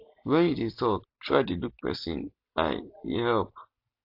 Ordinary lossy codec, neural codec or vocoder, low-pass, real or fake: AAC, 48 kbps; none; 5.4 kHz; real